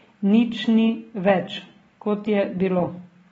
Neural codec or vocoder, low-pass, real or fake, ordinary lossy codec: none; 10.8 kHz; real; AAC, 24 kbps